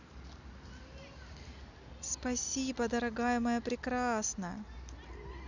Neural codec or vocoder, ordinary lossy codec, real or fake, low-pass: none; none; real; 7.2 kHz